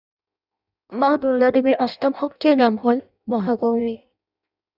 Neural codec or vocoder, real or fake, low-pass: codec, 16 kHz in and 24 kHz out, 0.6 kbps, FireRedTTS-2 codec; fake; 5.4 kHz